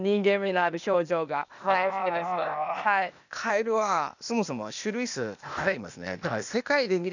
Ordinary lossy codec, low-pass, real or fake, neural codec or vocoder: none; 7.2 kHz; fake; codec, 16 kHz, 0.8 kbps, ZipCodec